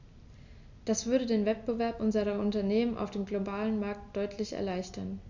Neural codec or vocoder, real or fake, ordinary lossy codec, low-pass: none; real; none; 7.2 kHz